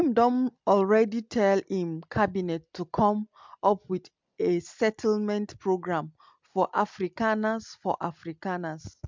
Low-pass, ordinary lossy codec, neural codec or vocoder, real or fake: 7.2 kHz; MP3, 64 kbps; none; real